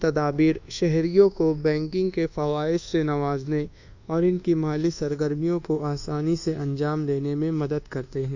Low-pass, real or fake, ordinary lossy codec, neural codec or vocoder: 7.2 kHz; fake; Opus, 64 kbps; codec, 24 kHz, 1.2 kbps, DualCodec